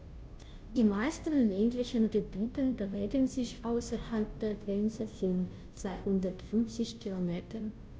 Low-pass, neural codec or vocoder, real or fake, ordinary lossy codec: none; codec, 16 kHz, 0.5 kbps, FunCodec, trained on Chinese and English, 25 frames a second; fake; none